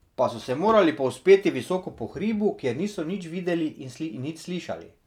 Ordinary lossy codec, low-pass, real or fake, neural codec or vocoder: none; 19.8 kHz; real; none